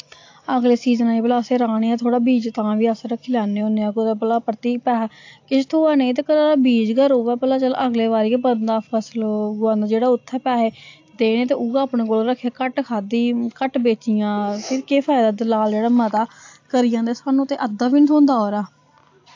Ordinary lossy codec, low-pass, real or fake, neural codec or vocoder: AAC, 48 kbps; 7.2 kHz; real; none